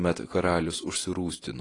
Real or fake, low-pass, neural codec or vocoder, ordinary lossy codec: real; 10.8 kHz; none; AAC, 32 kbps